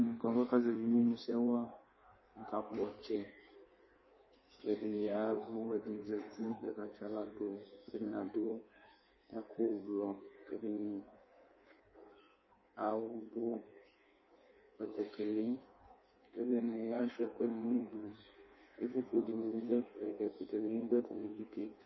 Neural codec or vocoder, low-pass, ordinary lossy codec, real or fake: codec, 16 kHz in and 24 kHz out, 1.1 kbps, FireRedTTS-2 codec; 7.2 kHz; MP3, 24 kbps; fake